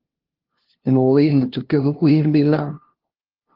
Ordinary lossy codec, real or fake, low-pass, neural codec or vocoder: Opus, 16 kbps; fake; 5.4 kHz; codec, 16 kHz, 0.5 kbps, FunCodec, trained on LibriTTS, 25 frames a second